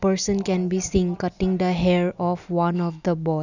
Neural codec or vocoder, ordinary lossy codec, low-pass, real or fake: none; none; 7.2 kHz; real